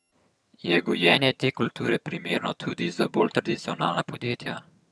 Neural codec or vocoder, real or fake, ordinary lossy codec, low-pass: vocoder, 22.05 kHz, 80 mel bands, HiFi-GAN; fake; none; none